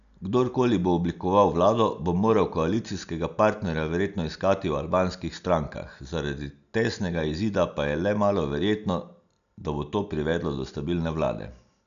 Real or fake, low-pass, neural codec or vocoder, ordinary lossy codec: real; 7.2 kHz; none; none